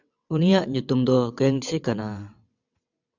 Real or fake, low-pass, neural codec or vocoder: fake; 7.2 kHz; vocoder, 22.05 kHz, 80 mel bands, WaveNeXt